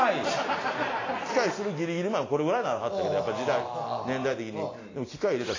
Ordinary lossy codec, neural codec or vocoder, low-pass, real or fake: AAC, 32 kbps; none; 7.2 kHz; real